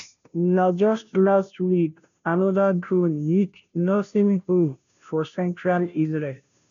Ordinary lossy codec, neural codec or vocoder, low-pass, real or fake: none; codec, 16 kHz, 0.5 kbps, FunCodec, trained on Chinese and English, 25 frames a second; 7.2 kHz; fake